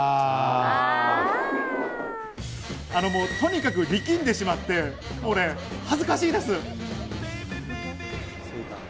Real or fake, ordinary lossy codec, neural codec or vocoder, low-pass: real; none; none; none